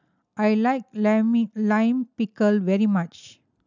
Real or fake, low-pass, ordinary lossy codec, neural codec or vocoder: real; 7.2 kHz; none; none